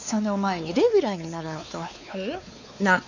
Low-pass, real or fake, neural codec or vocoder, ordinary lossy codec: 7.2 kHz; fake; codec, 16 kHz, 4 kbps, X-Codec, WavLM features, trained on Multilingual LibriSpeech; none